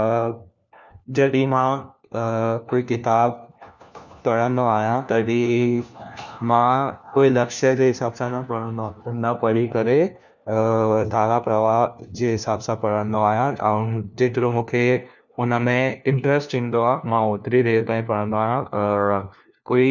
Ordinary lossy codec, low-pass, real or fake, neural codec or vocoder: none; 7.2 kHz; fake; codec, 16 kHz, 1 kbps, FunCodec, trained on LibriTTS, 50 frames a second